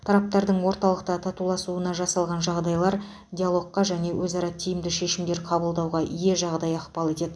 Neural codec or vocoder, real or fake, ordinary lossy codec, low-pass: none; real; none; none